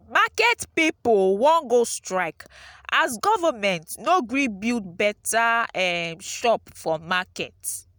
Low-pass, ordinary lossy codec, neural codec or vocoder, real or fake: none; none; none; real